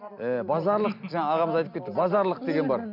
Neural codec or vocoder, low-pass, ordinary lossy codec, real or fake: none; 5.4 kHz; none; real